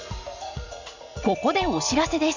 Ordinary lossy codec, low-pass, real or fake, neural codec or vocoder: none; 7.2 kHz; fake; vocoder, 44.1 kHz, 80 mel bands, Vocos